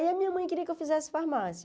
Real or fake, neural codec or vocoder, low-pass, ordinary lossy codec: real; none; none; none